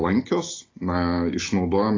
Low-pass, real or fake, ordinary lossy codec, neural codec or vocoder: 7.2 kHz; real; AAC, 32 kbps; none